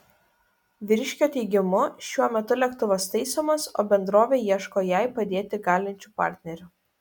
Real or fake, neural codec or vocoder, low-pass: real; none; 19.8 kHz